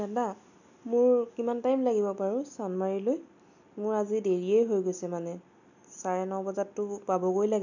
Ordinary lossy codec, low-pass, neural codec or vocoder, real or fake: none; 7.2 kHz; none; real